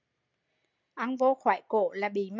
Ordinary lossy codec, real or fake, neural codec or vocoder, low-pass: MP3, 64 kbps; real; none; 7.2 kHz